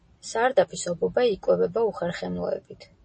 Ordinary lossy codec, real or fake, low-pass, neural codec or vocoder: MP3, 32 kbps; real; 9.9 kHz; none